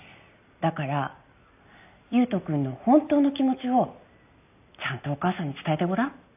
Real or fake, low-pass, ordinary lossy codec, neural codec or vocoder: real; 3.6 kHz; AAC, 32 kbps; none